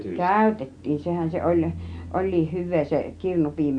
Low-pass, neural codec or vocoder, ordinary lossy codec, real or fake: 9.9 kHz; none; AAC, 64 kbps; real